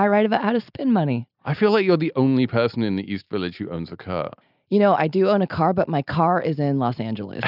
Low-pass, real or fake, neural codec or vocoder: 5.4 kHz; real; none